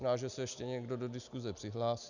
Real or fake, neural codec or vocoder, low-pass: real; none; 7.2 kHz